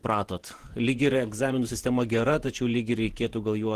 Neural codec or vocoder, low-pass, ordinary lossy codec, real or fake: vocoder, 48 kHz, 128 mel bands, Vocos; 14.4 kHz; Opus, 16 kbps; fake